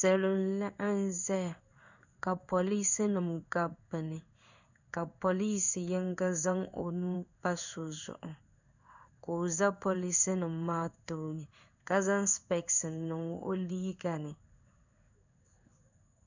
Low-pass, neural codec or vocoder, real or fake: 7.2 kHz; codec, 16 kHz in and 24 kHz out, 1 kbps, XY-Tokenizer; fake